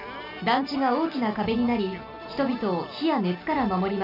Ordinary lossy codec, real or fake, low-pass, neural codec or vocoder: none; real; 5.4 kHz; none